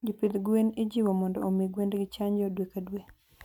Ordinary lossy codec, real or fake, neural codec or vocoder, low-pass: none; real; none; 19.8 kHz